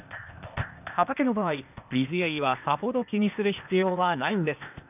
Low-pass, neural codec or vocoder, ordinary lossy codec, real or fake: 3.6 kHz; codec, 16 kHz, 0.8 kbps, ZipCodec; none; fake